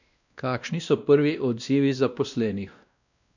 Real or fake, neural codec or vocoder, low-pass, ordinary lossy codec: fake; codec, 16 kHz, 1 kbps, X-Codec, WavLM features, trained on Multilingual LibriSpeech; 7.2 kHz; none